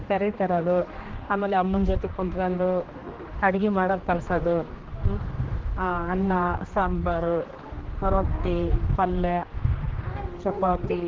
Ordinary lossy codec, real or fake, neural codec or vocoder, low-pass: Opus, 16 kbps; fake; codec, 16 kHz, 2 kbps, X-Codec, HuBERT features, trained on general audio; 7.2 kHz